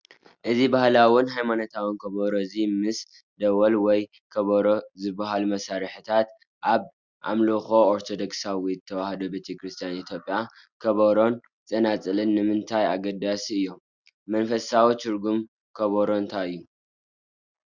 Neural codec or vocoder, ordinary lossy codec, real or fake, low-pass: none; Opus, 64 kbps; real; 7.2 kHz